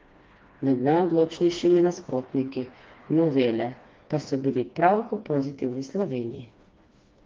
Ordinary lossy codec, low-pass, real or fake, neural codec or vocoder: Opus, 32 kbps; 7.2 kHz; fake; codec, 16 kHz, 2 kbps, FreqCodec, smaller model